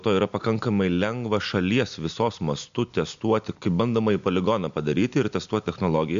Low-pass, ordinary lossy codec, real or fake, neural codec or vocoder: 7.2 kHz; MP3, 64 kbps; real; none